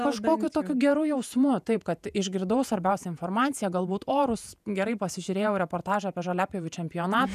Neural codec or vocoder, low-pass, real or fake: vocoder, 48 kHz, 128 mel bands, Vocos; 14.4 kHz; fake